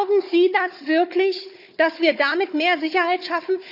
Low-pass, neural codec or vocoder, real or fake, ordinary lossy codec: 5.4 kHz; codec, 16 kHz, 4 kbps, FunCodec, trained on Chinese and English, 50 frames a second; fake; none